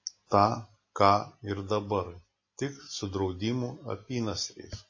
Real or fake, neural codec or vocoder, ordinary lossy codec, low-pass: real; none; MP3, 32 kbps; 7.2 kHz